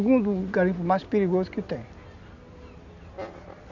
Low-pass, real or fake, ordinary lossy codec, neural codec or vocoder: 7.2 kHz; real; none; none